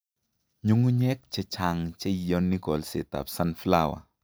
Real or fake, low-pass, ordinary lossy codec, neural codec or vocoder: real; none; none; none